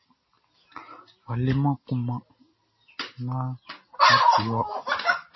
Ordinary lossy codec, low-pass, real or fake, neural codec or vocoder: MP3, 24 kbps; 7.2 kHz; real; none